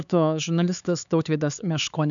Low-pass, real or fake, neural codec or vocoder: 7.2 kHz; fake; codec, 16 kHz, 4 kbps, X-Codec, HuBERT features, trained on LibriSpeech